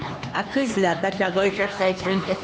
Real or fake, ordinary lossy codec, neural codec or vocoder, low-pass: fake; none; codec, 16 kHz, 2 kbps, X-Codec, HuBERT features, trained on LibriSpeech; none